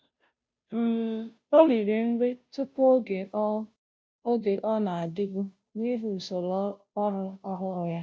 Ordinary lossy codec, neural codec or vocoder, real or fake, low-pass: none; codec, 16 kHz, 0.5 kbps, FunCodec, trained on Chinese and English, 25 frames a second; fake; none